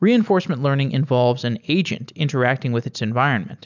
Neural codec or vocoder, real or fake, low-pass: none; real; 7.2 kHz